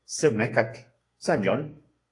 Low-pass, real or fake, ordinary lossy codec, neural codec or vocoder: 10.8 kHz; fake; AAC, 48 kbps; codec, 32 kHz, 1.9 kbps, SNAC